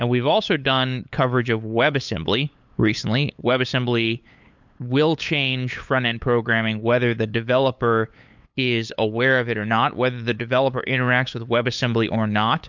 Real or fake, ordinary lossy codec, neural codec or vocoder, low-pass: fake; MP3, 64 kbps; codec, 16 kHz, 8 kbps, FunCodec, trained on LibriTTS, 25 frames a second; 7.2 kHz